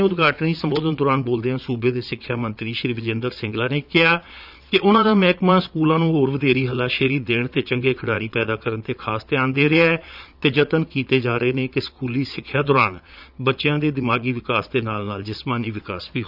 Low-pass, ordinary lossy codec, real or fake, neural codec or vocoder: 5.4 kHz; none; fake; vocoder, 22.05 kHz, 80 mel bands, Vocos